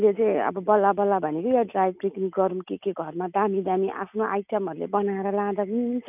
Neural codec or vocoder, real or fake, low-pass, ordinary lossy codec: none; real; 3.6 kHz; none